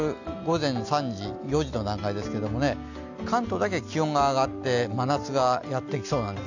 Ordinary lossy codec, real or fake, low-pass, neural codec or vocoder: none; real; 7.2 kHz; none